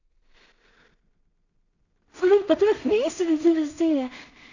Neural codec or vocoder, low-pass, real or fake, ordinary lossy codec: codec, 16 kHz in and 24 kHz out, 0.4 kbps, LongCat-Audio-Codec, two codebook decoder; 7.2 kHz; fake; none